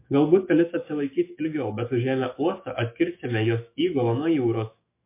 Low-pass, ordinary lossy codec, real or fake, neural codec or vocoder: 3.6 kHz; MP3, 24 kbps; fake; autoencoder, 48 kHz, 128 numbers a frame, DAC-VAE, trained on Japanese speech